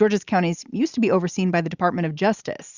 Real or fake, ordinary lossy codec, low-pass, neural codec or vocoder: real; Opus, 64 kbps; 7.2 kHz; none